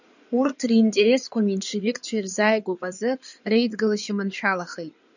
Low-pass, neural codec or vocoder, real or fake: 7.2 kHz; codec, 16 kHz in and 24 kHz out, 2.2 kbps, FireRedTTS-2 codec; fake